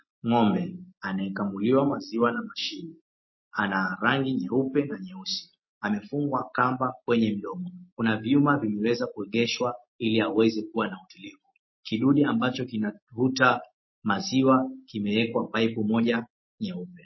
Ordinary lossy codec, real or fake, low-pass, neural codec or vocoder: MP3, 24 kbps; real; 7.2 kHz; none